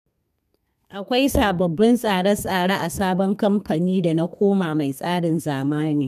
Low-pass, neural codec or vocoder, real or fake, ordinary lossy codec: 14.4 kHz; codec, 32 kHz, 1.9 kbps, SNAC; fake; none